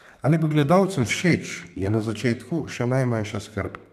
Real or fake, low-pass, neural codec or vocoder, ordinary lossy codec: fake; 14.4 kHz; codec, 32 kHz, 1.9 kbps, SNAC; none